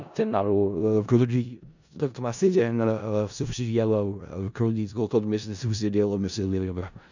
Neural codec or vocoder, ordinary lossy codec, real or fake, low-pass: codec, 16 kHz in and 24 kHz out, 0.4 kbps, LongCat-Audio-Codec, four codebook decoder; none; fake; 7.2 kHz